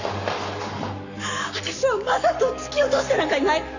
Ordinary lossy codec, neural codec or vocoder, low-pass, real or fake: none; codec, 44.1 kHz, 7.8 kbps, Pupu-Codec; 7.2 kHz; fake